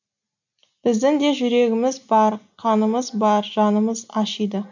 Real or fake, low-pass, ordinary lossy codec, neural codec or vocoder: real; 7.2 kHz; none; none